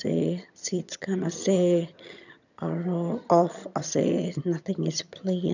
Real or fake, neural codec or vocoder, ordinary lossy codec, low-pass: fake; vocoder, 22.05 kHz, 80 mel bands, HiFi-GAN; none; 7.2 kHz